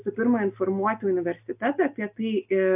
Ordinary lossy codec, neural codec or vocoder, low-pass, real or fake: AAC, 32 kbps; none; 3.6 kHz; real